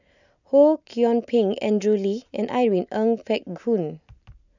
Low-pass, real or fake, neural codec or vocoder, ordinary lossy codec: 7.2 kHz; real; none; none